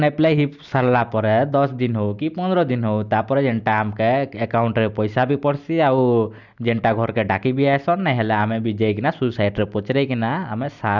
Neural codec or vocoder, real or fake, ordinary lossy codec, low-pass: none; real; none; 7.2 kHz